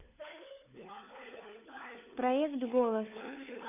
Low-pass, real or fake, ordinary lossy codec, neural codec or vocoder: 3.6 kHz; fake; none; codec, 16 kHz, 4 kbps, FunCodec, trained on LibriTTS, 50 frames a second